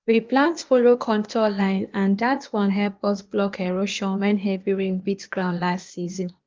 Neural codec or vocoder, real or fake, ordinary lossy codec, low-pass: codec, 16 kHz, 0.8 kbps, ZipCodec; fake; Opus, 32 kbps; 7.2 kHz